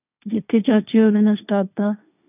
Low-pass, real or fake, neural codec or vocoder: 3.6 kHz; fake; codec, 16 kHz, 1.1 kbps, Voila-Tokenizer